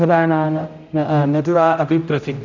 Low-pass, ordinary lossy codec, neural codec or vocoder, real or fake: 7.2 kHz; none; codec, 16 kHz, 0.5 kbps, X-Codec, HuBERT features, trained on general audio; fake